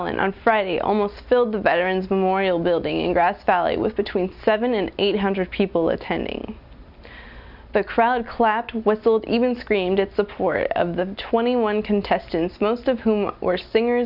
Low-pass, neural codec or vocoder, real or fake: 5.4 kHz; none; real